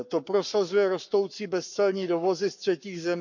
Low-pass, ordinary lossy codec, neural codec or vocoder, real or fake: 7.2 kHz; none; codec, 44.1 kHz, 7.8 kbps, Pupu-Codec; fake